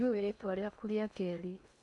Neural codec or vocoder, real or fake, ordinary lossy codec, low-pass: codec, 16 kHz in and 24 kHz out, 0.8 kbps, FocalCodec, streaming, 65536 codes; fake; none; 10.8 kHz